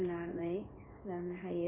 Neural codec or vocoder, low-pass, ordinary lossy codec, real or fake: codec, 16 kHz in and 24 kHz out, 1 kbps, XY-Tokenizer; 3.6 kHz; AAC, 32 kbps; fake